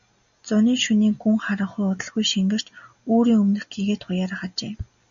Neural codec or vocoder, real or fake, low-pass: none; real; 7.2 kHz